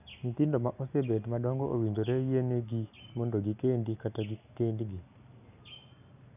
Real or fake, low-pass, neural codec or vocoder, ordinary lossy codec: real; 3.6 kHz; none; none